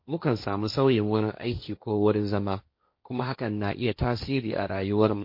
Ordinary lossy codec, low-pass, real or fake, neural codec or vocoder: MP3, 32 kbps; 5.4 kHz; fake; codec, 16 kHz, 1.1 kbps, Voila-Tokenizer